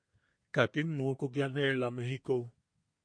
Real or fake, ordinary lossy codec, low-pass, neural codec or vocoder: fake; MP3, 48 kbps; 9.9 kHz; codec, 24 kHz, 1 kbps, SNAC